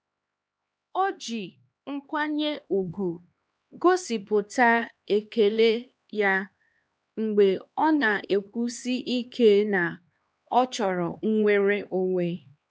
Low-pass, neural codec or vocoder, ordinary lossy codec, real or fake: none; codec, 16 kHz, 2 kbps, X-Codec, HuBERT features, trained on LibriSpeech; none; fake